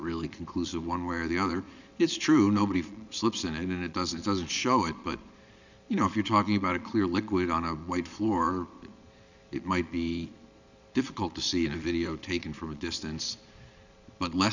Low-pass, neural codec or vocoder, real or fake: 7.2 kHz; vocoder, 22.05 kHz, 80 mel bands, Vocos; fake